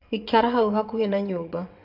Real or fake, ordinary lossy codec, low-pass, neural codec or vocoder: fake; AAC, 48 kbps; 5.4 kHz; vocoder, 22.05 kHz, 80 mel bands, WaveNeXt